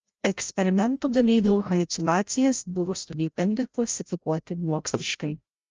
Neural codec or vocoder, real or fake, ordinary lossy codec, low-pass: codec, 16 kHz, 0.5 kbps, FreqCodec, larger model; fake; Opus, 24 kbps; 7.2 kHz